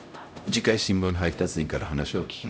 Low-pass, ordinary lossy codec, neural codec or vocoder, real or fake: none; none; codec, 16 kHz, 0.5 kbps, X-Codec, HuBERT features, trained on LibriSpeech; fake